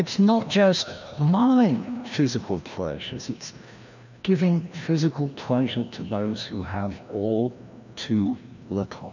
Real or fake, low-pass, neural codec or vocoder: fake; 7.2 kHz; codec, 16 kHz, 1 kbps, FreqCodec, larger model